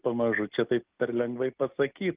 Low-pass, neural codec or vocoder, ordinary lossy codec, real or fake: 3.6 kHz; none; Opus, 32 kbps; real